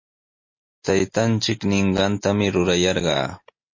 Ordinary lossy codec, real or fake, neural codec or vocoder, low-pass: MP3, 32 kbps; real; none; 7.2 kHz